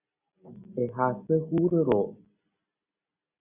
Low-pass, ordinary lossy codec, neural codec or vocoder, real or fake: 3.6 kHz; Opus, 64 kbps; none; real